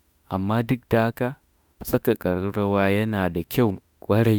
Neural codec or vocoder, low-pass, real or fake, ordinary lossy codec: autoencoder, 48 kHz, 32 numbers a frame, DAC-VAE, trained on Japanese speech; none; fake; none